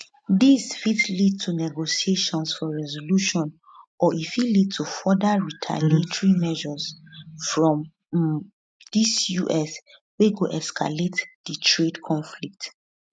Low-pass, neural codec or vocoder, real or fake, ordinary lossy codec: none; none; real; none